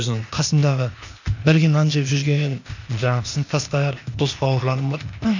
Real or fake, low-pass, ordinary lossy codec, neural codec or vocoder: fake; 7.2 kHz; none; codec, 16 kHz, 0.8 kbps, ZipCodec